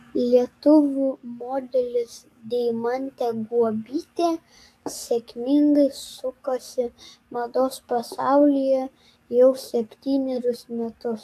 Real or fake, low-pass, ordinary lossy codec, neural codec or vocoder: fake; 14.4 kHz; AAC, 64 kbps; codec, 44.1 kHz, 7.8 kbps, DAC